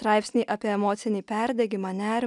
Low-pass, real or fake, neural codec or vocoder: 10.8 kHz; real; none